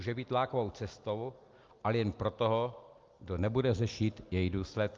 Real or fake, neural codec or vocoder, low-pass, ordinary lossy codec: real; none; 7.2 kHz; Opus, 24 kbps